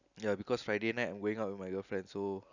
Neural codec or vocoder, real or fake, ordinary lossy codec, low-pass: none; real; none; 7.2 kHz